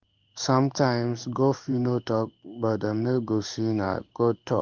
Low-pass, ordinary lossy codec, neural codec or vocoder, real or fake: 7.2 kHz; Opus, 32 kbps; codec, 16 kHz in and 24 kHz out, 1 kbps, XY-Tokenizer; fake